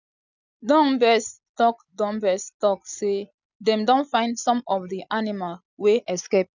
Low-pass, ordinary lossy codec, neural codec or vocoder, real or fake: 7.2 kHz; none; none; real